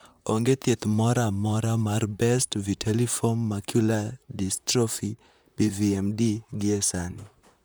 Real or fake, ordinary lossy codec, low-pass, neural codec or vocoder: fake; none; none; vocoder, 44.1 kHz, 128 mel bands, Pupu-Vocoder